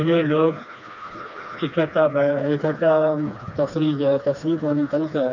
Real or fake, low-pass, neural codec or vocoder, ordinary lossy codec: fake; 7.2 kHz; codec, 16 kHz, 2 kbps, FreqCodec, smaller model; none